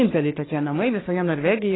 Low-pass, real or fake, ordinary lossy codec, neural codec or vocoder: 7.2 kHz; fake; AAC, 16 kbps; codec, 44.1 kHz, 3.4 kbps, Pupu-Codec